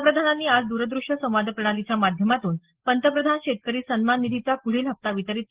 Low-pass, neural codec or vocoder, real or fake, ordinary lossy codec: 3.6 kHz; none; real; Opus, 16 kbps